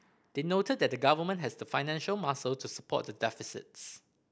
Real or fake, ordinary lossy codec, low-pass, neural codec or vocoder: real; none; none; none